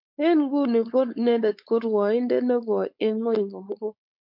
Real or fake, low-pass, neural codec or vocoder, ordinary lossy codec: fake; 5.4 kHz; codec, 16 kHz, 4.8 kbps, FACodec; MP3, 48 kbps